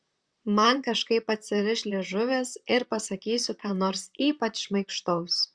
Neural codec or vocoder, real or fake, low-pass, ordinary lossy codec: vocoder, 44.1 kHz, 128 mel bands, Pupu-Vocoder; fake; 9.9 kHz; Opus, 64 kbps